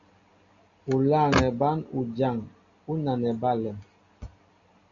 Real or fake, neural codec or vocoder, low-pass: real; none; 7.2 kHz